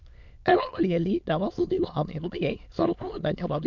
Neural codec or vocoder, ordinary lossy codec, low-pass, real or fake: autoencoder, 22.05 kHz, a latent of 192 numbers a frame, VITS, trained on many speakers; none; 7.2 kHz; fake